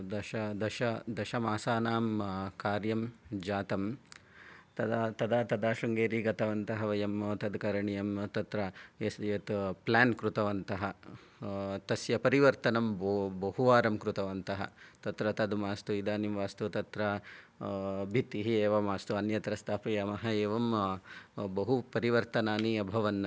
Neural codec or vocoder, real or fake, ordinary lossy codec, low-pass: none; real; none; none